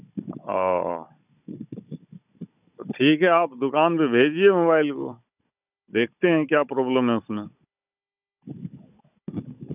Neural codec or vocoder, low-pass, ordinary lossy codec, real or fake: codec, 16 kHz, 16 kbps, FunCodec, trained on Chinese and English, 50 frames a second; 3.6 kHz; none; fake